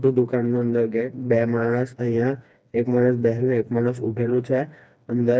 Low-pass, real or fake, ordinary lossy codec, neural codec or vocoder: none; fake; none; codec, 16 kHz, 2 kbps, FreqCodec, smaller model